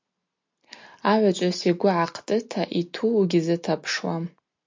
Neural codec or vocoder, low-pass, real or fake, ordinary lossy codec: none; 7.2 kHz; real; MP3, 64 kbps